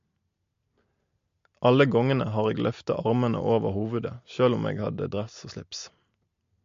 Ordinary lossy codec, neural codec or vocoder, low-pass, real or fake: MP3, 48 kbps; none; 7.2 kHz; real